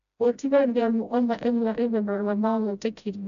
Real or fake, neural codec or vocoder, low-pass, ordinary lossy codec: fake; codec, 16 kHz, 0.5 kbps, FreqCodec, smaller model; 7.2 kHz; none